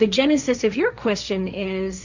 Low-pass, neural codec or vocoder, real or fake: 7.2 kHz; codec, 16 kHz, 1.1 kbps, Voila-Tokenizer; fake